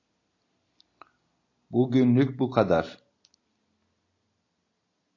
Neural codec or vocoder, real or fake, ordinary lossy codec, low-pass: none; real; AAC, 48 kbps; 7.2 kHz